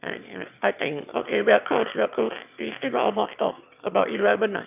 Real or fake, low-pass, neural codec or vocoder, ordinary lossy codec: fake; 3.6 kHz; autoencoder, 22.05 kHz, a latent of 192 numbers a frame, VITS, trained on one speaker; none